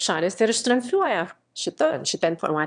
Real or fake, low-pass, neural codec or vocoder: fake; 9.9 kHz; autoencoder, 22.05 kHz, a latent of 192 numbers a frame, VITS, trained on one speaker